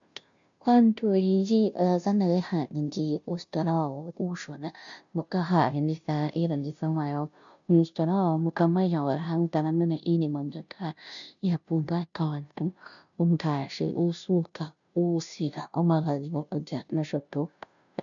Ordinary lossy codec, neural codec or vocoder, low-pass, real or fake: MP3, 96 kbps; codec, 16 kHz, 0.5 kbps, FunCodec, trained on Chinese and English, 25 frames a second; 7.2 kHz; fake